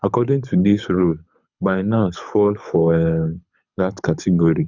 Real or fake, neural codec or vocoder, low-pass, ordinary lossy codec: fake; codec, 24 kHz, 6 kbps, HILCodec; 7.2 kHz; none